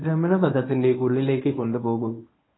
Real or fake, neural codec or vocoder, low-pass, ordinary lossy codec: fake; codec, 24 kHz, 0.9 kbps, WavTokenizer, medium speech release version 1; 7.2 kHz; AAC, 16 kbps